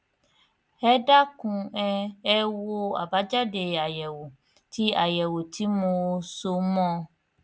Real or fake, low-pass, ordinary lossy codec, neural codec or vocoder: real; none; none; none